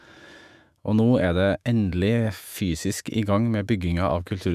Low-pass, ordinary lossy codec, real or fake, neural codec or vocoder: 14.4 kHz; none; fake; autoencoder, 48 kHz, 128 numbers a frame, DAC-VAE, trained on Japanese speech